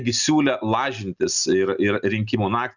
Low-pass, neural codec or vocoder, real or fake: 7.2 kHz; none; real